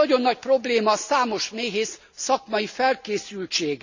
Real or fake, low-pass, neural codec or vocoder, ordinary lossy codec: real; 7.2 kHz; none; Opus, 64 kbps